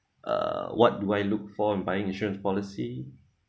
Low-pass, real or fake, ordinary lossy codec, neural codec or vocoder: none; real; none; none